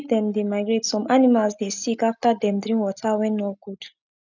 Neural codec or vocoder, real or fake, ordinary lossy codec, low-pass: none; real; none; 7.2 kHz